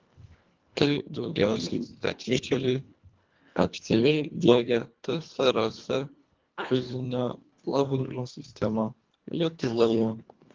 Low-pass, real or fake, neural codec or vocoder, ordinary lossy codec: 7.2 kHz; fake; codec, 24 kHz, 1.5 kbps, HILCodec; Opus, 32 kbps